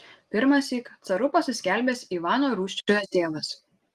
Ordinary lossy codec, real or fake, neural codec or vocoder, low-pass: Opus, 24 kbps; real; none; 14.4 kHz